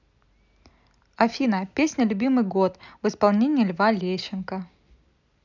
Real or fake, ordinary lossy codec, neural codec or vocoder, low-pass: real; none; none; 7.2 kHz